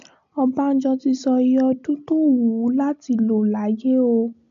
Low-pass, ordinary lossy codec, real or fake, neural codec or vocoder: 7.2 kHz; AAC, 64 kbps; real; none